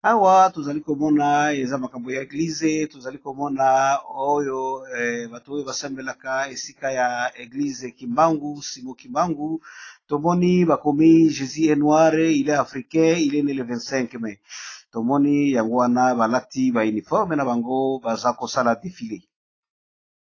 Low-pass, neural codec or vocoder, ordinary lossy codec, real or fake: 7.2 kHz; none; AAC, 32 kbps; real